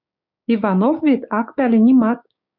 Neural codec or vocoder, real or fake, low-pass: codec, 16 kHz, 6 kbps, DAC; fake; 5.4 kHz